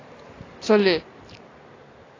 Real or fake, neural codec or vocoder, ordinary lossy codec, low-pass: real; none; AAC, 32 kbps; 7.2 kHz